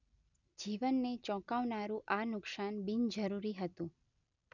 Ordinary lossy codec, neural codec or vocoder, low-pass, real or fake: none; none; 7.2 kHz; real